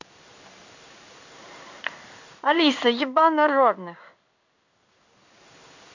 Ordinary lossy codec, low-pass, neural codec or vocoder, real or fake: none; 7.2 kHz; codec, 16 kHz in and 24 kHz out, 1 kbps, XY-Tokenizer; fake